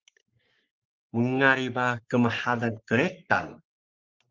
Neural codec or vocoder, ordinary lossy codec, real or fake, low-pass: codec, 44.1 kHz, 3.4 kbps, Pupu-Codec; Opus, 32 kbps; fake; 7.2 kHz